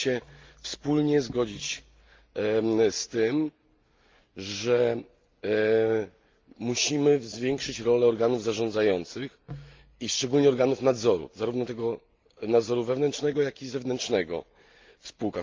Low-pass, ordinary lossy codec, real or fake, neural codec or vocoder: 7.2 kHz; Opus, 24 kbps; real; none